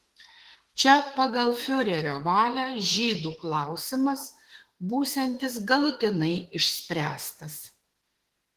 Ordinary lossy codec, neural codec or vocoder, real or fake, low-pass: Opus, 16 kbps; autoencoder, 48 kHz, 32 numbers a frame, DAC-VAE, trained on Japanese speech; fake; 14.4 kHz